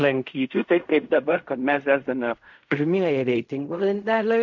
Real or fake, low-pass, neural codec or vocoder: fake; 7.2 kHz; codec, 16 kHz in and 24 kHz out, 0.4 kbps, LongCat-Audio-Codec, fine tuned four codebook decoder